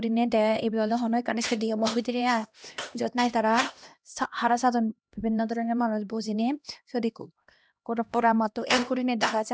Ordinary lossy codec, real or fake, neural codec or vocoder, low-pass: none; fake; codec, 16 kHz, 1 kbps, X-Codec, HuBERT features, trained on LibriSpeech; none